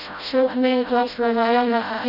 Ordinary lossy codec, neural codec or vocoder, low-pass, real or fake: none; codec, 16 kHz, 0.5 kbps, FreqCodec, smaller model; 5.4 kHz; fake